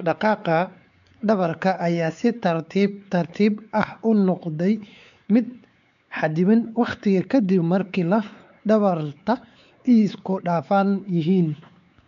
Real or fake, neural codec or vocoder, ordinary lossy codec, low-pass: fake; codec, 16 kHz, 4 kbps, X-Codec, WavLM features, trained on Multilingual LibriSpeech; none; 7.2 kHz